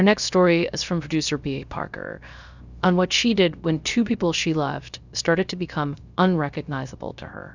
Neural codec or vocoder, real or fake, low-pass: codec, 16 kHz, 0.3 kbps, FocalCodec; fake; 7.2 kHz